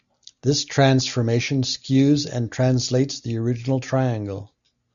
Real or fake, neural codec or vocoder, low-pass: real; none; 7.2 kHz